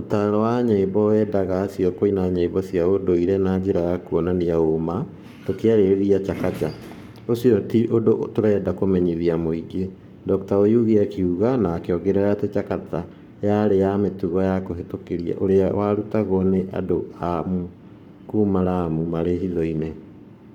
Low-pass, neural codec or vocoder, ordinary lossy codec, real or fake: 19.8 kHz; codec, 44.1 kHz, 7.8 kbps, Pupu-Codec; none; fake